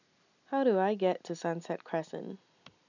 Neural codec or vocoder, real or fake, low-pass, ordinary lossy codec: none; real; 7.2 kHz; none